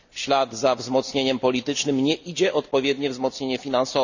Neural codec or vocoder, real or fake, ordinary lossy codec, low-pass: none; real; none; 7.2 kHz